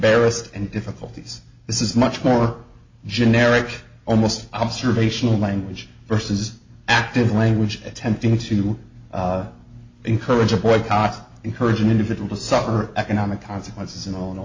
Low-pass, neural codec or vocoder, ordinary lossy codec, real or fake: 7.2 kHz; none; MP3, 48 kbps; real